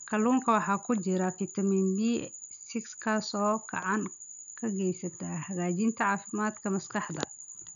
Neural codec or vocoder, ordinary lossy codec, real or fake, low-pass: none; none; real; 7.2 kHz